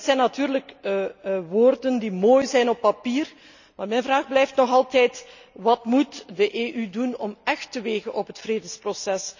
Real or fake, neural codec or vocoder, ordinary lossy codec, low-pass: real; none; none; 7.2 kHz